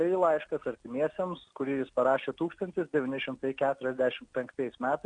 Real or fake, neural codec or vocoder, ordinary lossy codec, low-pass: real; none; Opus, 64 kbps; 9.9 kHz